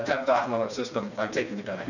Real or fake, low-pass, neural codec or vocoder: fake; 7.2 kHz; codec, 16 kHz, 2 kbps, FreqCodec, smaller model